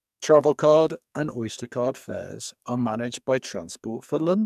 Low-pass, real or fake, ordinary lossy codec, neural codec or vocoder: 14.4 kHz; fake; none; codec, 44.1 kHz, 2.6 kbps, SNAC